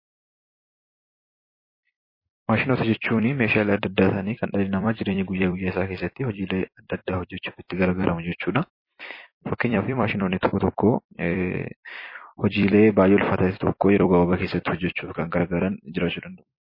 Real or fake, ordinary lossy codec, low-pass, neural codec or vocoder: fake; MP3, 24 kbps; 5.4 kHz; vocoder, 44.1 kHz, 128 mel bands every 256 samples, BigVGAN v2